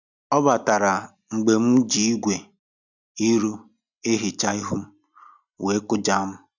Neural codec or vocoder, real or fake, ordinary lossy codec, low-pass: none; real; none; 7.2 kHz